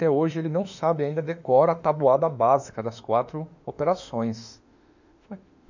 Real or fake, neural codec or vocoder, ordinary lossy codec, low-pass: fake; autoencoder, 48 kHz, 32 numbers a frame, DAC-VAE, trained on Japanese speech; none; 7.2 kHz